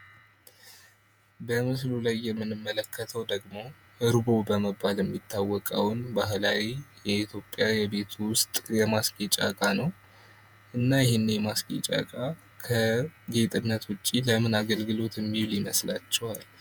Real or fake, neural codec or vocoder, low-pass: fake; vocoder, 48 kHz, 128 mel bands, Vocos; 19.8 kHz